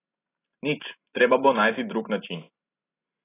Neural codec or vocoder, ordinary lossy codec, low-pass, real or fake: none; AAC, 24 kbps; 3.6 kHz; real